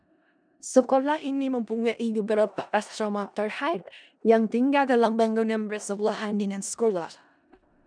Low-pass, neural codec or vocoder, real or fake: 9.9 kHz; codec, 16 kHz in and 24 kHz out, 0.4 kbps, LongCat-Audio-Codec, four codebook decoder; fake